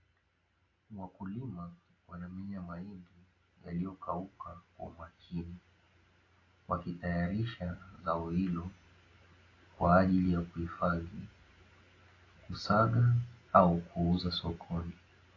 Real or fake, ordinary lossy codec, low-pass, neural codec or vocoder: real; AAC, 32 kbps; 7.2 kHz; none